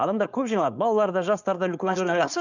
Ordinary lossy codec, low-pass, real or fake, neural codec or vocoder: none; 7.2 kHz; fake; codec, 16 kHz, 4.8 kbps, FACodec